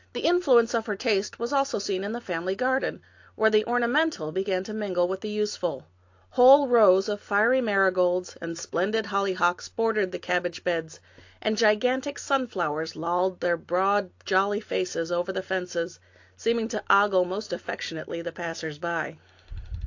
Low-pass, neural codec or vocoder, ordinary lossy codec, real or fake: 7.2 kHz; none; AAC, 48 kbps; real